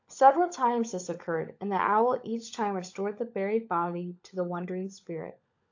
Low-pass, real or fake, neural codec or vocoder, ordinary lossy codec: 7.2 kHz; fake; codec, 16 kHz, 16 kbps, FunCodec, trained on LibriTTS, 50 frames a second; AAC, 48 kbps